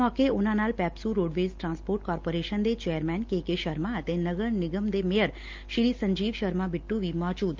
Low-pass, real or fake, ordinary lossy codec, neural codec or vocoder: 7.2 kHz; real; Opus, 24 kbps; none